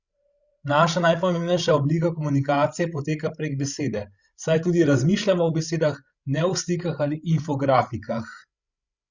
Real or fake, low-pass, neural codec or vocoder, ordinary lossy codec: fake; 7.2 kHz; codec, 16 kHz, 16 kbps, FreqCodec, larger model; Opus, 64 kbps